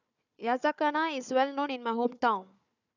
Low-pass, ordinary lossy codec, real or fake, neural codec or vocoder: 7.2 kHz; none; fake; codec, 16 kHz, 4 kbps, FunCodec, trained on Chinese and English, 50 frames a second